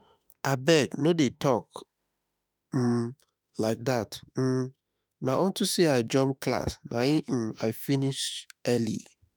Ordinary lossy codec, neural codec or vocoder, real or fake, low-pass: none; autoencoder, 48 kHz, 32 numbers a frame, DAC-VAE, trained on Japanese speech; fake; none